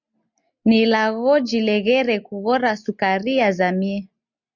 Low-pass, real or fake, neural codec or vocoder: 7.2 kHz; real; none